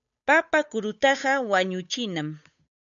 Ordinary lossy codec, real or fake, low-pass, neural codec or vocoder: MP3, 96 kbps; fake; 7.2 kHz; codec, 16 kHz, 8 kbps, FunCodec, trained on Chinese and English, 25 frames a second